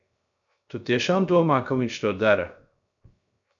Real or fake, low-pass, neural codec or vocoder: fake; 7.2 kHz; codec, 16 kHz, 0.3 kbps, FocalCodec